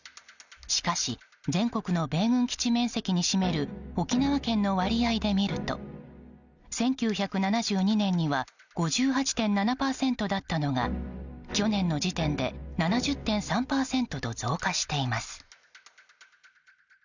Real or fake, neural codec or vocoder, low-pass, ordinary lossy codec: real; none; 7.2 kHz; none